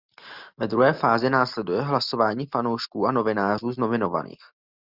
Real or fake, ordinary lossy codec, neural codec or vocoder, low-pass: real; Opus, 64 kbps; none; 5.4 kHz